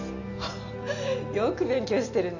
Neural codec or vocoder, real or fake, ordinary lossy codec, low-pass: none; real; none; 7.2 kHz